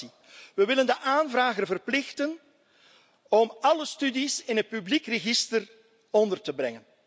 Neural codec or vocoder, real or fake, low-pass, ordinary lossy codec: none; real; none; none